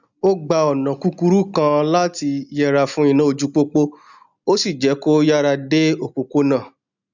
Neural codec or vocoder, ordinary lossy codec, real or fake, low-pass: none; none; real; 7.2 kHz